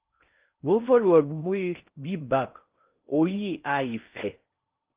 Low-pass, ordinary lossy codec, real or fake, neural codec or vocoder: 3.6 kHz; Opus, 24 kbps; fake; codec, 16 kHz in and 24 kHz out, 0.6 kbps, FocalCodec, streaming, 4096 codes